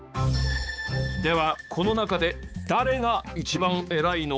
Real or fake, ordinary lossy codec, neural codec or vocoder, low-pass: fake; none; codec, 16 kHz, 4 kbps, X-Codec, HuBERT features, trained on balanced general audio; none